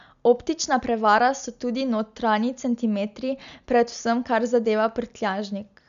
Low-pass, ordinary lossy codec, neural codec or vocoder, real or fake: 7.2 kHz; none; none; real